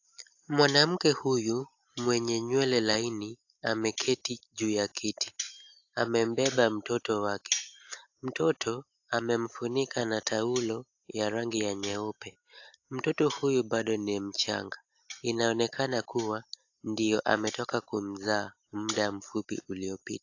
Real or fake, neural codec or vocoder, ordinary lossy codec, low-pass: real; none; AAC, 48 kbps; 7.2 kHz